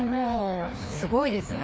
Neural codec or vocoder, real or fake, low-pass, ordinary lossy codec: codec, 16 kHz, 2 kbps, FreqCodec, larger model; fake; none; none